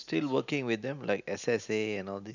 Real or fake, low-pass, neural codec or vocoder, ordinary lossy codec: real; 7.2 kHz; none; none